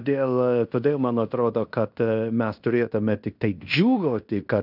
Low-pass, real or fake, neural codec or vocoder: 5.4 kHz; fake; codec, 16 kHz in and 24 kHz out, 0.9 kbps, LongCat-Audio-Codec, fine tuned four codebook decoder